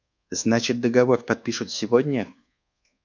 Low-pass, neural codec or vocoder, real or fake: 7.2 kHz; codec, 24 kHz, 1.2 kbps, DualCodec; fake